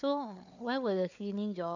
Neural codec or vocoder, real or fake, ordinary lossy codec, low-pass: codec, 16 kHz, 4 kbps, FunCodec, trained on Chinese and English, 50 frames a second; fake; none; 7.2 kHz